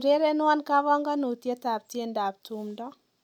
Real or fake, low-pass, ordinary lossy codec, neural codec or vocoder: real; 19.8 kHz; none; none